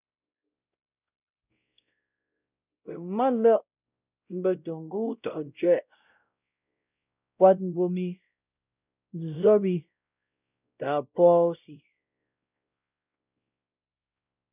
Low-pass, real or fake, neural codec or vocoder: 3.6 kHz; fake; codec, 16 kHz, 0.5 kbps, X-Codec, WavLM features, trained on Multilingual LibriSpeech